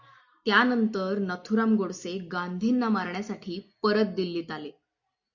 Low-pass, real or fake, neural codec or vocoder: 7.2 kHz; real; none